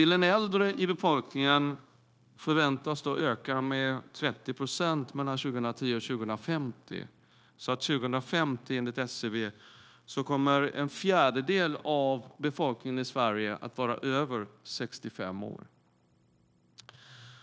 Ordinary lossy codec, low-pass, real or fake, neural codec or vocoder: none; none; fake; codec, 16 kHz, 0.9 kbps, LongCat-Audio-Codec